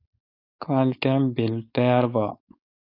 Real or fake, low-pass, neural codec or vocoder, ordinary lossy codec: fake; 5.4 kHz; codec, 16 kHz, 4.8 kbps, FACodec; AAC, 32 kbps